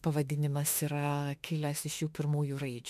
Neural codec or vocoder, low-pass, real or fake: autoencoder, 48 kHz, 32 numbers a frame, DAC-VAE, trained on Japanese speech; 14.4 kHz; fake